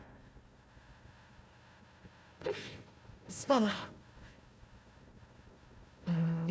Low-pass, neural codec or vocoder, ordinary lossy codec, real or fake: none; codec, 16 kHz, 1 kbps, FunCodec, trained on Chinese and English, 50 frames a second; none; fake